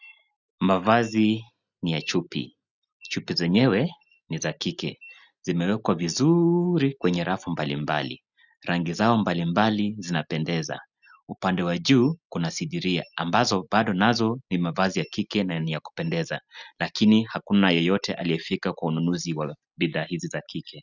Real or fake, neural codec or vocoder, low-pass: real; none; 7.2 kHz